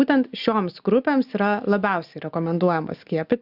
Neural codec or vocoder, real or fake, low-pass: none; real; 5.4 kHz